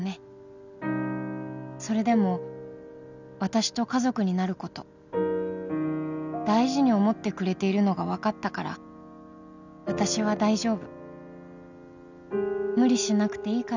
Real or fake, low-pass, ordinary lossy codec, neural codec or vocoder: real; 7.2 kHz; none; none